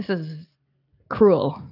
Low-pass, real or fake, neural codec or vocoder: 5.4 kHz; real; none